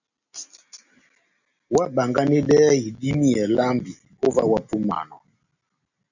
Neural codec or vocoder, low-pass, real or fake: none; 7.2 kHz; real